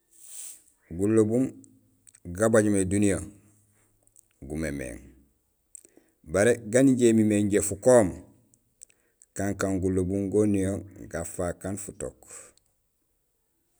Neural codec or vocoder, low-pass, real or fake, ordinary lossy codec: none; none; real; none